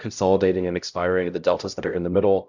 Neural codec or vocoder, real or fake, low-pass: codec, 16 kHz, 0.5 kbps, X-Codec, HuBERT features, trained on LibriSpeech; fake; 7.2 kHz